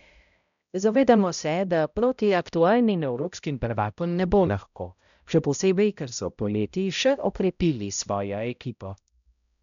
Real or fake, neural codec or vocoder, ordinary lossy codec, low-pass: fake; codec, 16 kHz, 0.5 kbps, X-Codec, HuBERT features, trained on balanced general audio; none; 7.2 kHz